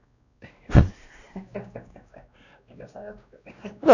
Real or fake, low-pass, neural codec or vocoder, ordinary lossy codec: fake; 7.2 kHz; codec, 16 kHz, 1 kbps, X-Codec, WavLM features, trained on Multilingual LibriSpeech; none